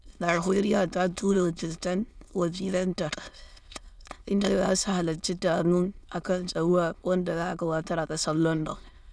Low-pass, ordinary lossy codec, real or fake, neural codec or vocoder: none; none; fake; autoencoder, 22.05 kHz, a latent of 192 numbers a frame, VITS, trained on many speakers